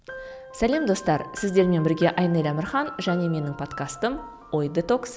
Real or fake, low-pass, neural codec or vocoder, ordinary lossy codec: real; none; none; none